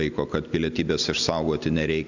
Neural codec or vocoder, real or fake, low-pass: none; real; 7.2 kHz